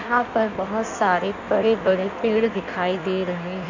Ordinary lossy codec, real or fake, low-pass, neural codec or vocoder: none; fake; 7.2 kHz; codec, 16 kHz in and 24 kHz out, 1.1 kbps, FireRedTTS-2 codec